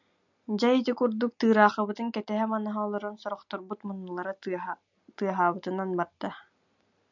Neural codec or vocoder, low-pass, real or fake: none; 7.2 kHz; real